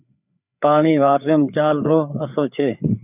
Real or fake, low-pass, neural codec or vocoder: fake; 3.6 kHz; codec, 16 kHz, 4 kbps, FreqCodec, larger model